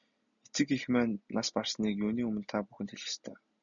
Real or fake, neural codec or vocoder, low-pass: real; none; 7.2 kHz